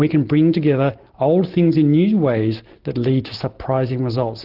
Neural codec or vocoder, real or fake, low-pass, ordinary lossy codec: none; real; 5.4 kHz; Opus, 16 kbps